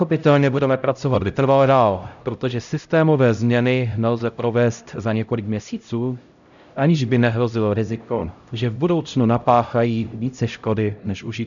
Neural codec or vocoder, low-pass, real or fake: codec, 16 kHz, 0.5 kbps, X-Codec, HuBERT features, trained on LibriSpeech; 7.2 kHz; fake